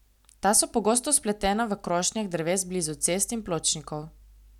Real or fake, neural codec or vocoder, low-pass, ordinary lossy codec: real; none; 19.8 kHz; none